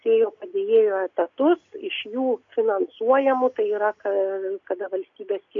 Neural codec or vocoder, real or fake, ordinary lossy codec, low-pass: none; real; AAC, 48 kbps; 7.2 kHz